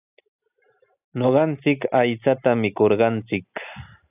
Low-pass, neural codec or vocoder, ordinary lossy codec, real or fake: 3.6 kHz; none; AAC, 32 kbps; real